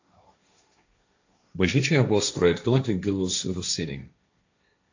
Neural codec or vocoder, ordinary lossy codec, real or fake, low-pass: codec, 16 kHz, 1.1 kbps, Voila-Tokenizer; AAC, 48 kbps; fake; 7.2 kHz